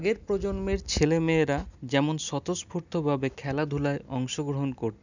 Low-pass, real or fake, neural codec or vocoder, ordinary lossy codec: 7.2 kHz; real; none; none